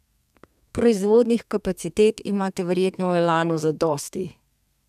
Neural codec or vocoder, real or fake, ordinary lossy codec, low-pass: codec, 32 kHz, 1.9 kbps, SNAC; fake; none; 14.4 kHz